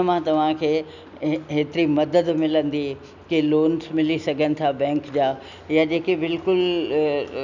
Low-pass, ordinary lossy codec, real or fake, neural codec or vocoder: 7.2 kHz; none; real; none